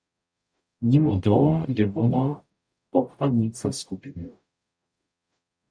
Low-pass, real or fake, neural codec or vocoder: 9.9 kHz; fake; codec, 44.1 kHz, 0.9 kbps, DAC